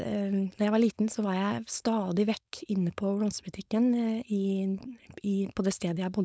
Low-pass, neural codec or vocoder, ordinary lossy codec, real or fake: none; codec, 16 kHz, 4.8 kbps, FACodec; none; fake